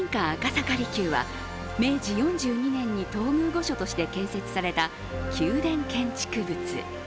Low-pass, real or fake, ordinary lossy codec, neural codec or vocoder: none; real; none; none